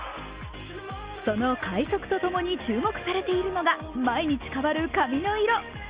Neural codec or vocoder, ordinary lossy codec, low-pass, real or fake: none; Opus, 32 kbps; 3.6 kHz; real